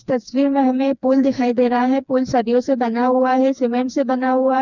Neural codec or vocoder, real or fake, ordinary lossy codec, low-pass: codec, 16 kHz, 2 kbps, FreqCodec, smaller model; fake; none; 7.2 kHz